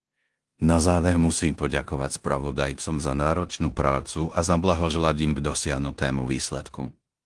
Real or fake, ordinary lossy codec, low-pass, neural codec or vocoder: fake; Opus, 24 kbps; 10.8 kHz; codec, 16 kHz in and 24 kHz out, 0.9 kbps, LongCat-Audio-Codec, four codebook decoder